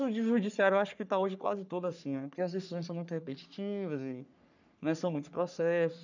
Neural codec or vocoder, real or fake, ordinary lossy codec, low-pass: codec, 44.1 kHz, 3.4 kbps, Pupu-Codec; fake; none; 7.2 kHz